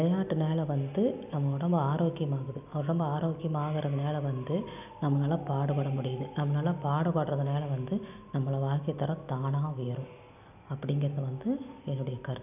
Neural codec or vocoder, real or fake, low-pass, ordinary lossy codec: none; real; 3.6 kHz; none